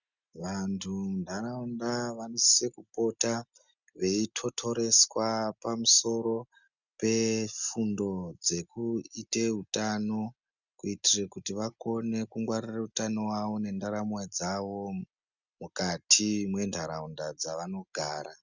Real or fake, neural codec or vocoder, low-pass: real; none; 7.2 kHz